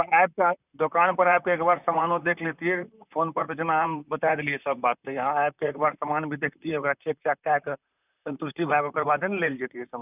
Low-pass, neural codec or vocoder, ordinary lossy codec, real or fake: 3.6 kHz; vocoder, 44.1 kHz, 128 mel bands, Pupu-Vocoder; none; fake